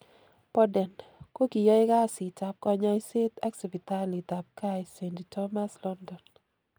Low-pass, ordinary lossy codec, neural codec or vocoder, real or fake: none; none; none; real